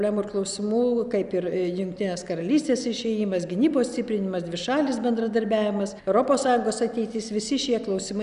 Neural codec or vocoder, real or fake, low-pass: none; real; 10.8 kHz